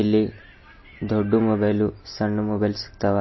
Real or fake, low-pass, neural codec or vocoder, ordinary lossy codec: real; 7.2 kHz; none; MP3, 24 kbps